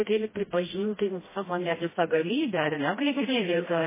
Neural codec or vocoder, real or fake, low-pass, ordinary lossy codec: codec, 16 kHz, 1 kbps, FreqCodec, smaller model; fake; 3.6 kHz; MP3, 16 kbps